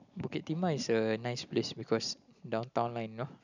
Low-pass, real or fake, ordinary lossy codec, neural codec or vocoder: 7.2 kHz; real; none; none